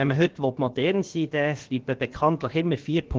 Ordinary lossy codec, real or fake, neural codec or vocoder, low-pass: Opus, 16 kbps; fake; codec, 16 kHz, about 1 kbps, DyCAST, with the encoder's durations; 7.2 kHz